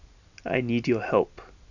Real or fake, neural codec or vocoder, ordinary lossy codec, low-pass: real; none; none; 7.2 kHz